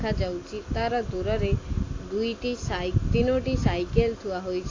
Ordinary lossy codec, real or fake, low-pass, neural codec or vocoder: AAC, 32 kbps; real; 7.2 kHz; none